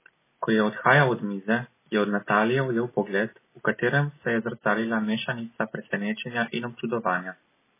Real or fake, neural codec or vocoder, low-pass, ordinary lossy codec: real; none; 3.6 kHz; MP3, 16 kbps